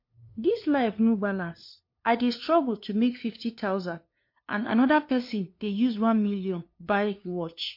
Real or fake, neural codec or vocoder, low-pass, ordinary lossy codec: fake; codec, 16 kHz, 2 kbps, FunCodec, trained on LibriTTS, 25 frames a second; 5.4 kHz; MP3, 32 kbps